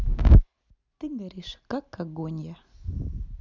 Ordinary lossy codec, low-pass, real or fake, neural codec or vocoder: none; 7.2 kHz; real; none